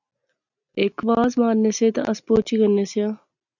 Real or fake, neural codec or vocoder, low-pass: real; none; 7.2 kHz